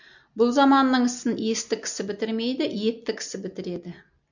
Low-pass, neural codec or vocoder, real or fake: 7.2 kHz; none; real